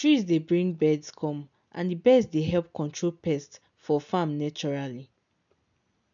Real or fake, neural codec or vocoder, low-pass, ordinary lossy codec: real; none; 7.2 kHz; none